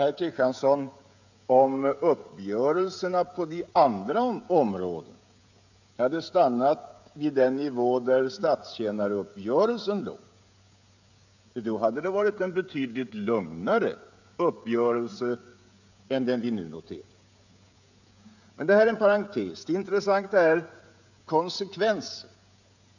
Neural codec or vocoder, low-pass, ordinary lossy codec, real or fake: codec, 16 kHz, 16 kbps, FreqCodec, smaller model; 7.2 kHz; none; fake